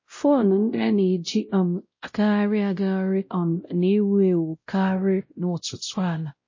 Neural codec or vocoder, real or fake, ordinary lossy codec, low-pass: codec, 16 kHz, 0.5 kbps, X-Codec, WavLM features, trained on Multilingual LibriSpeech; fake; MP3, 32 kbps; 7.2 kHz